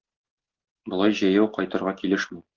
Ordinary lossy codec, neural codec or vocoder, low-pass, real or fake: Opus, 16 kbps; none; 7.2 kHz; real